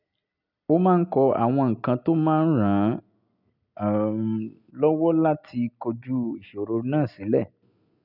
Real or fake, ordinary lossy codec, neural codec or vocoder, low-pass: real; none; none; 5.4 kHz